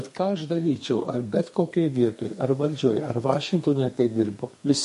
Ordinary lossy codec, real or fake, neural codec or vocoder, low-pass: MP3, 48 kbps; fake; codec, 32 kHz, 1.9 kbps, SNAC; 14.4 kHz